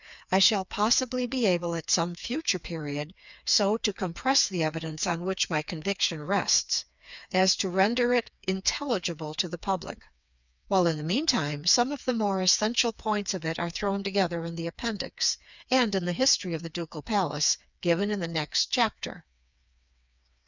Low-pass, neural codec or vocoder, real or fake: 7.2 kHz; codec, 16 kHz, 4 kbps, FreqCodec, smaller model; fake